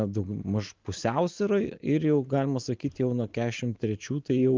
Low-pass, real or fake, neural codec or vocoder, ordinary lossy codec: 7.2 kHz; real; none; Opus, 32 kbps